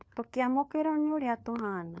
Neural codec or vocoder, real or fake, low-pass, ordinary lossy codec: codec, 16 kHz, 4 kbps, FunCodec, trained on LibriTTS, 50 frames a second; fake; none; none